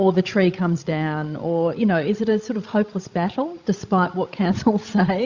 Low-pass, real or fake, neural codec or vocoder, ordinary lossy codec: 7.2 kHz; fake; codec, 16 kHz, 16 kbps, FreqCodec, larger model; Opus, 64 kbps